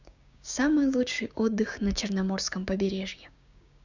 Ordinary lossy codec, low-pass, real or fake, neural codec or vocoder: none; 7.2 kHz; fake; codec, 16 kHz, 6 kbps, DAC